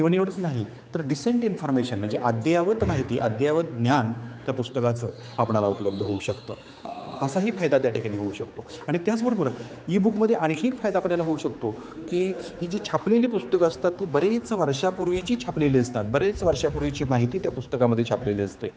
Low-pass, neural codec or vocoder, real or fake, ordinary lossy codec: none; codec, 16 kHz, 4 kbps, X-Codec, HuBERT features, trained on general audio; fake; none